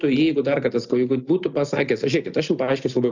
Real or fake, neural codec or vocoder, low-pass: real; none; 7.2 kHz